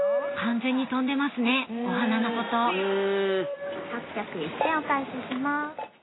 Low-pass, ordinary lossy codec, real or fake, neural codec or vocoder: 7.2 kHz; AAC, 16 kbps; real; none